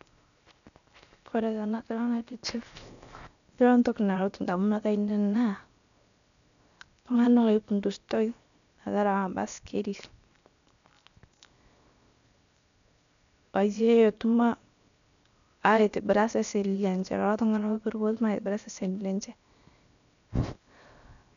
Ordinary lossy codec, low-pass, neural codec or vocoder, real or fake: none; 7.2 kHz; codec, 16 kHz, 0.7 kbps, FocalCodec; fake